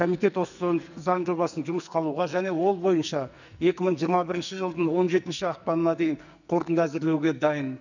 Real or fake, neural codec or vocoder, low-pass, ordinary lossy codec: fake; codec, 44.1 kHz, 2.6 kbps, SNAC; 7.2 kHz; none